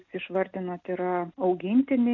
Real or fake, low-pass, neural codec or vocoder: real; 7.2 kHz; none